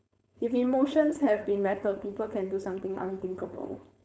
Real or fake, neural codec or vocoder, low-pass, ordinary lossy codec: fake; codec, 16 kHz, 4.8 kbps, FACodec; none; none